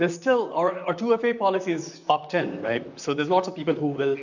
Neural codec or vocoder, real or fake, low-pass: vocoder, 44.1 kHz, 128 mel bands, Pupu-Vocoder; fake; 7.2 kHz